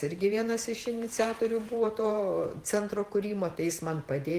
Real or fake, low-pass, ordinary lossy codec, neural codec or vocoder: fake; 14.4 kHz; Opus, 24 kbps; vocoder, 48 kHz, 128 mel bands, Vocos